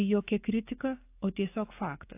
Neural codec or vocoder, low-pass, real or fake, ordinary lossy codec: codec, 44.1 kHz, 7.8 kbps, DAC; 3.6 kHz; fake; AAC, 24 kbps